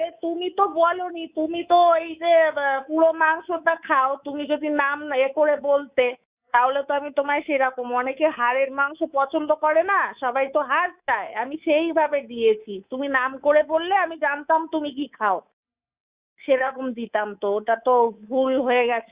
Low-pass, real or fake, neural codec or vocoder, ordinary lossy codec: 3.6 kHz; fake; codec, 24 kHz, 3.1 kbps, DualCodec; Opus, 64 kbps